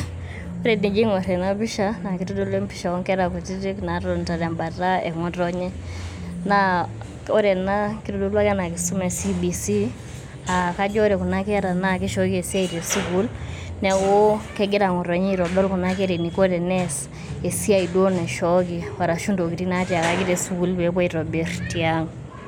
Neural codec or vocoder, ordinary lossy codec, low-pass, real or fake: none; none; 19.8 kHz; real